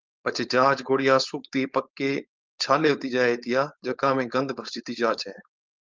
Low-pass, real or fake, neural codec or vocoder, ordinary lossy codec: 7.2 kHz; fake; codec, 16 kHz, 4.8 kbps, FACodec; Opus, 24 kbps